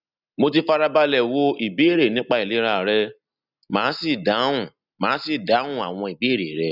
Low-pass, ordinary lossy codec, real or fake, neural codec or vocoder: 5.4 kHz; none; real; none